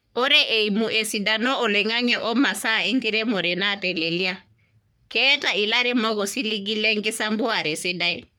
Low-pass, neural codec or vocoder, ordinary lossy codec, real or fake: none; codec, 44.1 kHz, 3.4 kbps, Pupu-Codec; none; fake